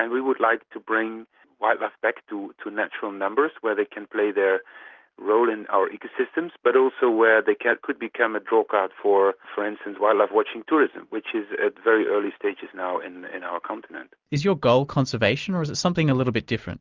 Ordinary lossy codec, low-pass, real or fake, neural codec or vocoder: Opus, 24 kbps; 7.2 kHz; real; none